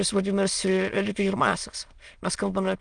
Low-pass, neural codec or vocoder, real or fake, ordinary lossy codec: 9.9 kHz; autoencoder, 22.05 kHz, a latent of 192 numbers a frame, VITS, trained on many speakers; fake; Opus, 32 kbps